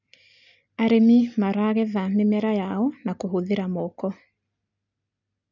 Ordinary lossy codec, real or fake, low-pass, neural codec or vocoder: none; real; 7.2 kHz; none